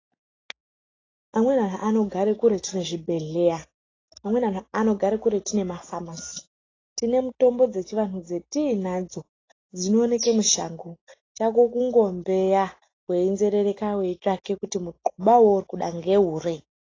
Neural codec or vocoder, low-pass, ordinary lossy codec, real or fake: none; 7.2 kHz; AAC, 32 kbps; real